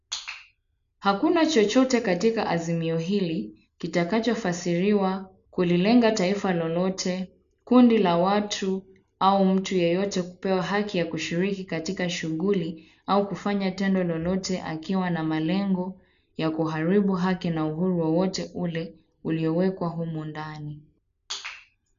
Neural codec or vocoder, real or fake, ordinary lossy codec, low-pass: none; real; none; 7.2 kHz